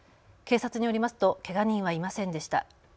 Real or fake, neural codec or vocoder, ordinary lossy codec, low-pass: real; none; none; none